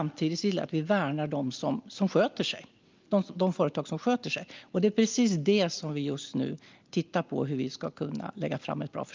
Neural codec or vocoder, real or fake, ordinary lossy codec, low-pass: none; real; Opus, 24 kbps; 7.2 kHz